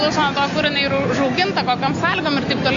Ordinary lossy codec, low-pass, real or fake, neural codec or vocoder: AAC, 48 kbps; 7.2 kHz; real; none